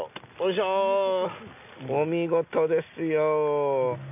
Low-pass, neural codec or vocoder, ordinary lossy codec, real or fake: 3.6 kHz; none; none; real